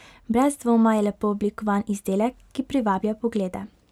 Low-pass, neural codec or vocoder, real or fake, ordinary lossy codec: 19.8 kHz; none; real; none